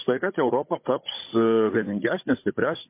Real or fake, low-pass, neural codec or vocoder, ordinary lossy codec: fake; 3.6 kHz; codec, 16 kHz, 16 kbps, FunCodec, trained on Chinese and English, 50 frames a second; MP3, 24 kbps